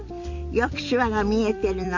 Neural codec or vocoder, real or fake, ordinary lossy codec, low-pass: vocoder, 44.1 kHz, 128 mel bands every 256 samples, BigVGAN v2; fake; AAC, 48 kbps; 7.2 kHz